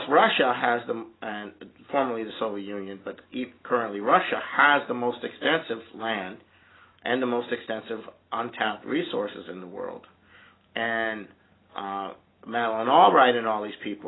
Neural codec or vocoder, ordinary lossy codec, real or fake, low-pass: none; AAC, 16 kbps; real; 7.2 kHz